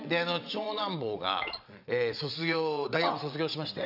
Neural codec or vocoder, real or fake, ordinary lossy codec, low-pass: vocoder, 22.05 kHz, 80 mel bands, WaveNeXt; fake; none; 5.4 kHz